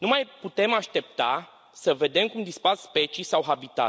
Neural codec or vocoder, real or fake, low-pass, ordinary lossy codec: none; real; none; none